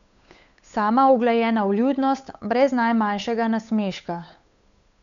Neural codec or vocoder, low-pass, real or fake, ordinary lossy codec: codec, 16 kHz, 8 kbps, FunCodec, trained on Chinese and English, 25 frames a second; 7.2 kHz; fake; none